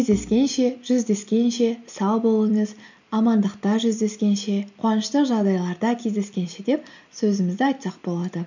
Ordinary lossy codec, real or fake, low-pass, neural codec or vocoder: none; real; 7.2 kHz; none